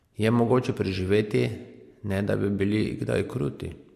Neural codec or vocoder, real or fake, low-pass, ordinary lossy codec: none; real; 14.4 kHz; MP3, 64 kbps